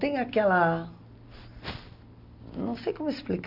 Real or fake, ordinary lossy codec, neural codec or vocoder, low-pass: real; none; none; 5.4 kHz